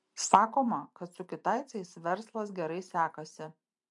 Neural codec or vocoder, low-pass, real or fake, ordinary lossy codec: vocoder, 44.1 kHz, 128 mel bands every 512 samples, BigVGAN v2; 10.8 kHz; fake; MP3, 64 kbps